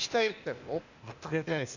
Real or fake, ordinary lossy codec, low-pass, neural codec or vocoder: fake; none; 7.2 kHz; codec, 16 kHz, 0.8 kbps, ZipCodec